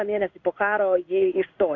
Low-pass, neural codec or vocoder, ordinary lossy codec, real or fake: 7.2 kHz; codec, 16 kHz in and 24 kHz out, 1 kbps, XY-Tokenizer; AAC, 48 kbps; fake